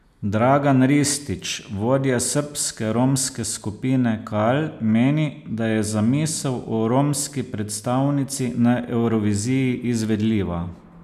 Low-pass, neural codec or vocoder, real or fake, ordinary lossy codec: 14.4 kHz; none; real; none